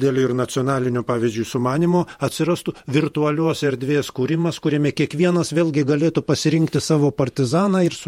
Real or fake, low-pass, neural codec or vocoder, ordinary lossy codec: fake; 19.8 kHz; vocoder, 48 kHz, 128 mel bands, Vocos; MP3, 64 kbps